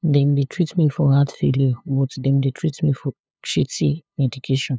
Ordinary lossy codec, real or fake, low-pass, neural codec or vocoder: none; fake; none; codec, 16 kHz, 2 kbps, FunCodec, trained on LibriTTS, 25 frames a second